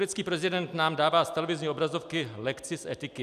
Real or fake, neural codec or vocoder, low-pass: real; none; 14.4 kHz